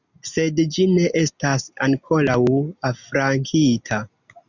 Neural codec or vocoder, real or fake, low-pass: none; real; 7.2 kHz